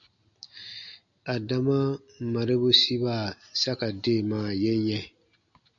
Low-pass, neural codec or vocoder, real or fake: 7.2 kHz; none; real